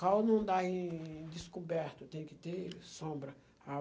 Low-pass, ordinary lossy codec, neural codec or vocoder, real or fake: none; none; none; real